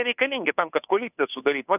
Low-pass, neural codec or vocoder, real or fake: 3.6 kHz; codec, 16 kHz, 2 kbps, FunCodec, trained on Chinese and English, 25 frames a second; fake